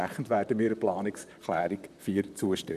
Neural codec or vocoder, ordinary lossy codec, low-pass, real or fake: vocoder, 44.1 kHz, 128 mel bands, Pupu-Vocoder; none; 14.4 kHz; fake